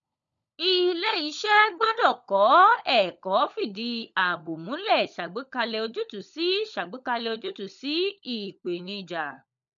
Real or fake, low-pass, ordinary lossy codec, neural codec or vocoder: fake; 7.2 kHz; none; codec, 16 kHz, 16 kbps, FunCodec, trained on LibriTTS, 50 frames a second